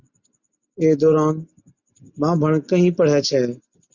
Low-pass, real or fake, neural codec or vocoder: 7.2 kHz; real; none